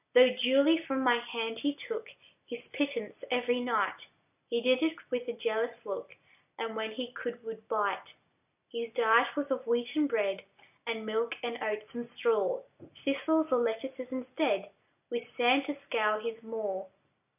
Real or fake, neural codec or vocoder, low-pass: real; none; 3.6 kHz